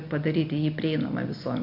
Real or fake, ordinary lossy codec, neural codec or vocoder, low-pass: real; MP3, 48 kbps; none; 5.4 kHz